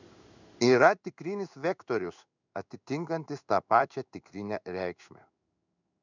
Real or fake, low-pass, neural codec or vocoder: fake; 7.2 kHz; codec, 16 kHz in and 24 kHz out, 1 kbps, XY-Tokenizer